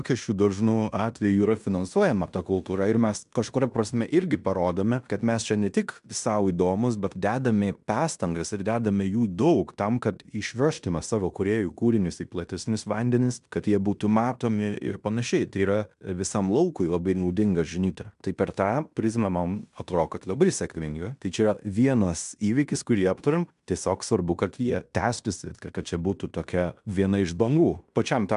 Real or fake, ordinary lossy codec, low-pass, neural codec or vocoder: fake; MP3, 96 kbps; 10.8 kHz; codec, 16 kHz in and 24 kHz out, 0.9 kbps, LongCat-Audio-Codec, fine tuned four codebook decoder